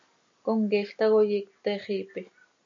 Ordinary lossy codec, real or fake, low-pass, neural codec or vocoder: MP3, 64 kbps; real; 7.2 kHz; none